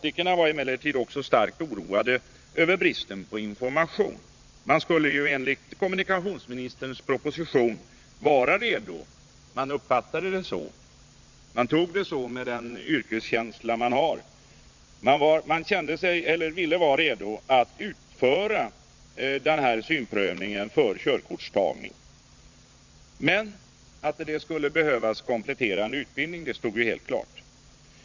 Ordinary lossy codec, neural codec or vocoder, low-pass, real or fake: Opus, 64 kbps; vocoder, 22.05 kHz, 80 mel bands, Vocos; 7.2 kHz; fake